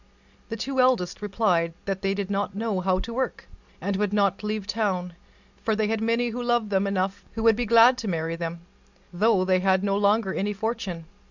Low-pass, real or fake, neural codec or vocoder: 7.2 kHz; real; none